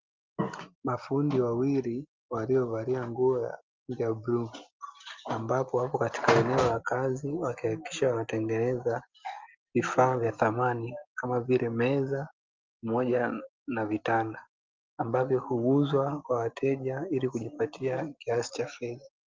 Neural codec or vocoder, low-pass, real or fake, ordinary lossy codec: none; 7.2 kHz; real; Opus, 32 kbps